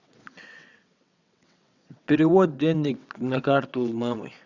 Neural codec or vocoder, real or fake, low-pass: vocoder, 22.05 kHz, 80 mel bands, WaveNeXt; fake; 7.2 kHz